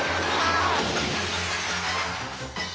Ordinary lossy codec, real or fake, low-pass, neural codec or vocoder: none; real; none; none